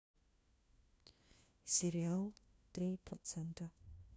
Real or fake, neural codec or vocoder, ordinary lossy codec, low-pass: fake; codec, 16 kHz, 1 kbps, FunCodec, trained on LibriTTS, 50 frames a second; none; none